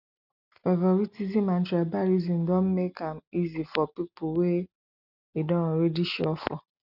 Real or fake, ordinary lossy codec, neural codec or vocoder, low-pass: real; none; none; 5.4 kHz